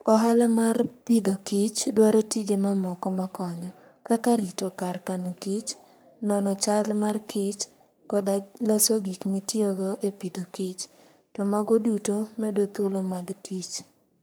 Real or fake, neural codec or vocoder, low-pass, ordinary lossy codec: fake; codec, 44.1 kHz, 3.4 kbps, Pupu-Codec; none; none